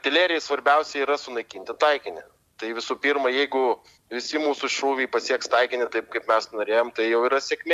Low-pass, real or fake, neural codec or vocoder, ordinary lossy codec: 14.4 kHz; real; none; MP3, 96 kbps